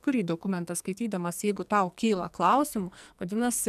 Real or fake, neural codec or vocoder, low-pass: fake; codec, 44.1 kHz, 2.6 kbps, SNAC; 14.4 kHz